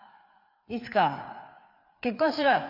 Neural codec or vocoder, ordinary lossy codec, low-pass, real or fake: codec, 16 kHz, 4 kbps, FreqCodec, larger model; none; 5.4 kHz; fake